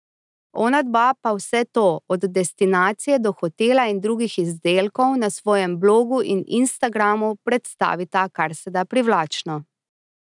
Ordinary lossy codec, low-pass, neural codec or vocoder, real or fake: none; 10.8 kHz; none; real